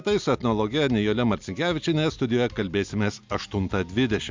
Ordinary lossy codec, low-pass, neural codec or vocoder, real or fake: MP3, 64 kbps; 7.2 kHz; none; real